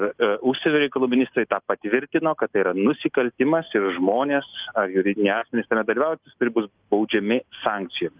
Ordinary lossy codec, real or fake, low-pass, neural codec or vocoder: Opus, 24 kbps; real; 3.6 kHz; none